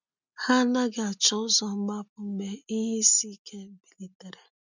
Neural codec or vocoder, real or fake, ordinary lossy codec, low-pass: none; real; none; 7.2 kHz